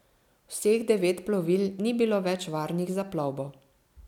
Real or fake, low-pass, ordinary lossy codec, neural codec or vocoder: real; 19.8 kHz; none; none